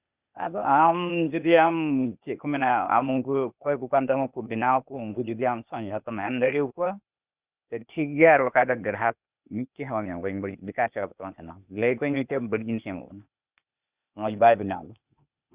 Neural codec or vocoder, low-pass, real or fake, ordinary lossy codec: codec, 16 kHz, 0.8 kbps, ZipCodec; 3.6 kHz; fake; Opus, 64 kbps